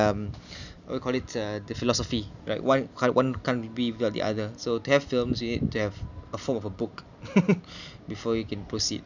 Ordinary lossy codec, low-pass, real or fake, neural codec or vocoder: none; 7.2 kHz; real; none